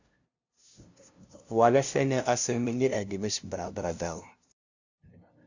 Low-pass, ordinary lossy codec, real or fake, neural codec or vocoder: 7.2 kHz; Opus, 64 kbps; fake; codec, 16 kHz, 0.5 kbps, FunCodec, trained on LibriTTS, 25 frames a second